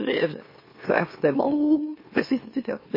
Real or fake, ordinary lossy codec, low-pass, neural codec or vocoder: fake; MP3, 24 kbps; 5.4 kHz; autoencoder, 44.1 kHz, a latent of 192 numbers a frame, MeloTTS